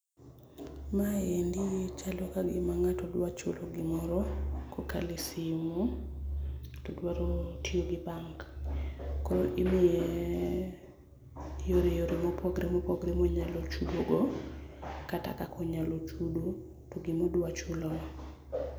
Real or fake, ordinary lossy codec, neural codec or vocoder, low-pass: real; none; none; none